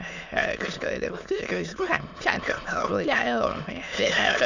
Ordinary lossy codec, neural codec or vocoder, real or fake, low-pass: none; autoencoder, 22.05 kHz, a latent of 192 numbers a frame, VITS, trained on many speakers; fake; 7.2 kHz